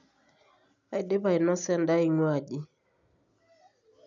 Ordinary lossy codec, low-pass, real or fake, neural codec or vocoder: none; 7.2 kHz; real; none